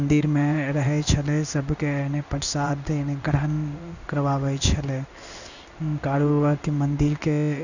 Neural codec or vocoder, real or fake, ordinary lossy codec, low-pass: codec, 16 kHz in and 24 kHz out, 1 kbps, XY-Tokenizer; fake; none; 7.2 kHz